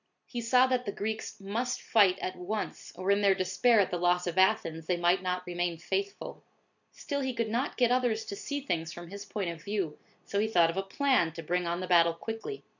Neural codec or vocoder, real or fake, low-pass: none; real; 7.2 kHz